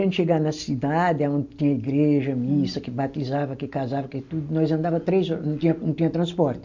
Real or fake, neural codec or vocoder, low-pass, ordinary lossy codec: real; none; 7.2 kHz; MP3, 48 kbps